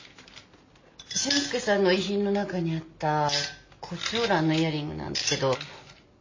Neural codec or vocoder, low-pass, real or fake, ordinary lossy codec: none; 7.2 kHz; real; MP3, 32 kbps